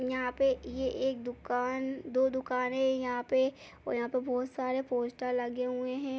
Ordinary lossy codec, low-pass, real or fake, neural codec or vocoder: none; none; real; none